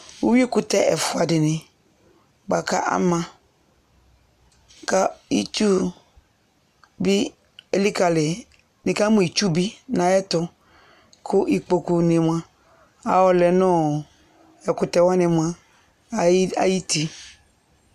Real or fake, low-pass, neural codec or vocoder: real; 14.4 kHz; none